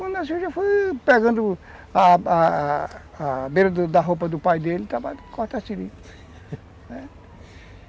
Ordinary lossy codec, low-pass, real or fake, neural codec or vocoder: none; none; real; none